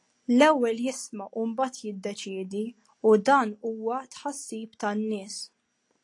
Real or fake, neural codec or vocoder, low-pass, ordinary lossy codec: real; none; 10.8 kHz; AAC, 48 kbps